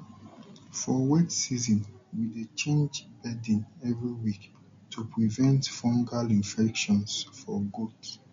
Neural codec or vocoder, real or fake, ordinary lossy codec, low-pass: none; real; MP3, 48 kbps; 7.2 kHz